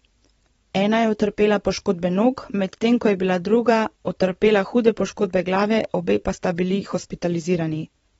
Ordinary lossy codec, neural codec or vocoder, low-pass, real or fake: AAC, 24 kbps; vocoder, 44.1 kHz, 128 mel bands every 512 samples, BigVGAN v2; 19.8 kHz; fake